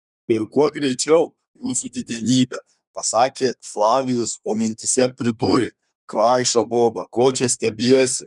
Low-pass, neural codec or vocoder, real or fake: 10.8 kHz; codec, 24 kHz, 1 kbps, SNAC; fake